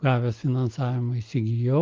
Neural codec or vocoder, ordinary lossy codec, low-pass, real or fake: none; Opus, 32 kbps; 7.2 kHz; real